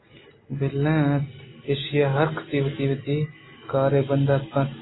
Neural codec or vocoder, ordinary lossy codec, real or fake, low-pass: none; AAC, 16 kbps; real; 7.2 kHz